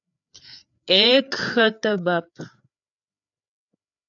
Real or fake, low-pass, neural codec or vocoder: fake; 7.2 kHz; codec, 16 kHz, 4 kbps, FreqCodec, larger model